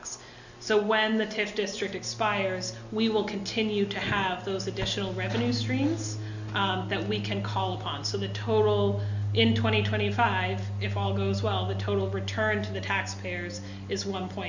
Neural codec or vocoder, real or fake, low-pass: none; real; 7.2 kHz